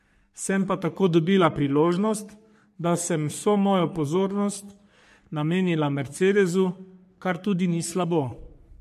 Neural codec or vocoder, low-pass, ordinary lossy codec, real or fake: codec, 44.1 kHz, 3.4 kbps, Pupu-Codec; 14.4 kHz; MP3, 64 kbps; fake